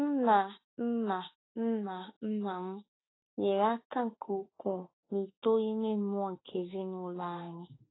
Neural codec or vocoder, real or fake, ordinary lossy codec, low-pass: autoencoder, 48 kHz, 32 numbers a frame, DAC-VAE, trained on Japanese speech; fake; AAC, 16 kbps; 7.2 kHz